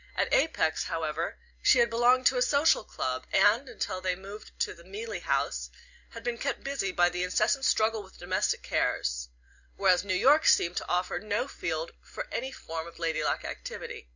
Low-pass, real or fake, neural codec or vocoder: 7.2 kHz; real; none